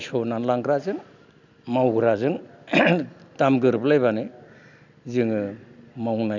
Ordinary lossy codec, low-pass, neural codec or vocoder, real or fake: none; 7.2 kHz; none; real